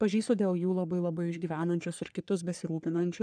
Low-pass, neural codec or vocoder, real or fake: 9.9 kHz; codec, 44.1 kHz, 3.4 kbps, Pupu-Codec; fake